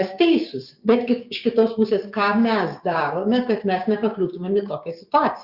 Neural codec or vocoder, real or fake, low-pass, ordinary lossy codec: codec, 16 kHz, 6 kbps, DAC; fake; 5.4 kHz; Opus, 64 kbps